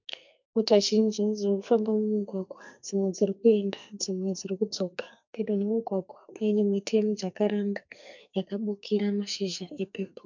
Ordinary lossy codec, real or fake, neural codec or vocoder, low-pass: MP3, 64 kbps; fake; codec, 44.1 kHz, 2.6 kbps, SNAC; 7.2 kHz